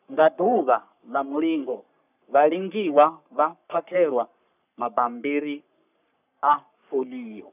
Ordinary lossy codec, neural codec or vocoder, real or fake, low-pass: none; codec, 44.1 kHz, 3.4 kbps, Pupu-Codec; fake; 3.6 kHz